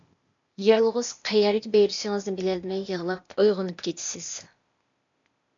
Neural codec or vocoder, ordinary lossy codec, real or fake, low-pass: codec, 16 kHz, 0.8 kbps, ZipCodec; MP3, 64 kbps; fake; 7.2 kHz